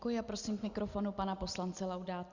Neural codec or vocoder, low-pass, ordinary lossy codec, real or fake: none; 7.2 kHz; Opus, 64 kbps; real